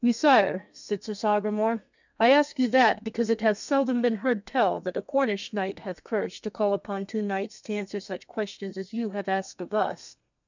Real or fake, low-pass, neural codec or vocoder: fake; 7.2 kHz; codec, 32 kHz, 1.9 kbps, SNAC